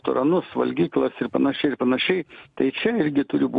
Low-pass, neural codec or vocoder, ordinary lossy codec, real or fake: 10.8 kHz; none; MP3, 96 kbps; real